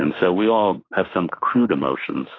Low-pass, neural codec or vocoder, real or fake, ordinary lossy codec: 7.2 kHz; autoencoder, 48 kHz, 32 numbers a frame, DAC-VAE, trained on Japanese speech; fake; AAC, 32 kbps